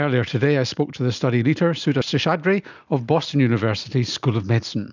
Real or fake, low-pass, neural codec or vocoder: real; 7.2 kHz; none